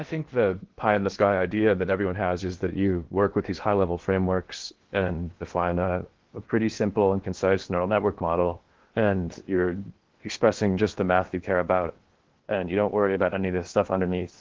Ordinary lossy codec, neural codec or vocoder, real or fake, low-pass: Opus, 16 kbps; codec, 16 kHz in and 24 kHz out, 0.8 kbps, FocalCodec, streaming, 65536 codes; fake; 7.2 kHz